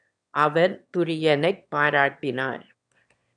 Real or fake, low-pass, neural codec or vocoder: fake; 9.9 kHz; autoencoder, 22.05 kHz, a latent of 192 numbers a frame, VITS, trained on one speaker